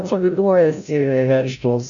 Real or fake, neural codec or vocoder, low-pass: fake; codec, 16 kHz, 0.5 kbps, FreqCodec, larger model; 7.2 kHz